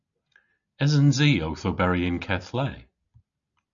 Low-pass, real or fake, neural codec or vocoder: 7.2 kHz; real; none